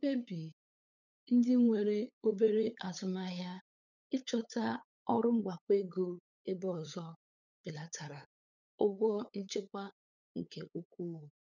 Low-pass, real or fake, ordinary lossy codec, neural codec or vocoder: 7.2 kHz; fake; none; codec, 16 kHz, 8 kbps, FunCodec, trained on LibriTTS, 25 frames a second